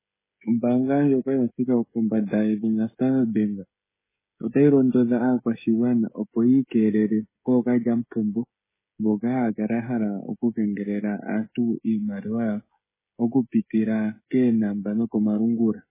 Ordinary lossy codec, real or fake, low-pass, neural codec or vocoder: MP3, 16 kbps; fake; 3.6 kHz; codec, 16 kHz, 16 kbps, FreqCodec, smaller model